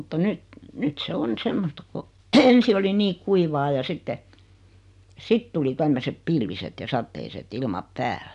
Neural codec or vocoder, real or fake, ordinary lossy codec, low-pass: none; real; none; 10.8 kHz